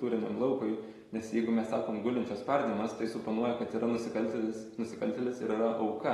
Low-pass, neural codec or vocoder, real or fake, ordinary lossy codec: 19.8 kHz; none; real; AAC, 32 kbps